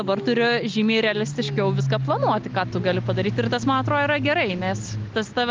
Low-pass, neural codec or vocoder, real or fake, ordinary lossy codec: 7.2 kHz; none; real; Opus, 32 kbps